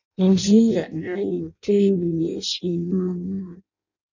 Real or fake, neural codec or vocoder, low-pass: fake; codec, 16 kHz in and 24 kHz out, 0.6 kbps, FireRedTTS-2 codec; 7.2 kHz